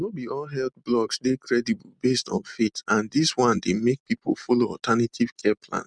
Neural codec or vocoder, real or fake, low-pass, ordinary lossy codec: none; real; 9.9 kHz; none